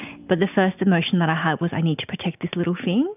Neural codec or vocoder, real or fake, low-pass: none; real; 3.6 kHz